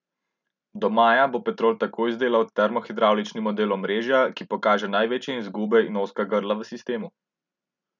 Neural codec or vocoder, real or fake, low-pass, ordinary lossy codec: none; real; 7.2 kHz; none